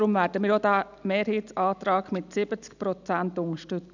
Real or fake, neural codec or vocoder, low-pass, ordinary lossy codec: real; none; 7.2 kHz; none